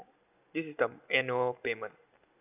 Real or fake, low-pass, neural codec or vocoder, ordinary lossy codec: real; 3.6 kHz; none; none